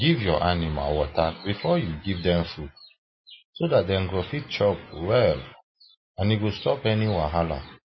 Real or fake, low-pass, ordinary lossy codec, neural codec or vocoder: real; 7.2 kHz; MP3, 24 kbps; none